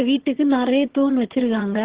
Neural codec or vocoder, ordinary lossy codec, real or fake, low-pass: vocoder, 22.05 kHz, 80 mel bands, HiFi-GAN; Opus, 16 kbps; fake; 3.6 kHz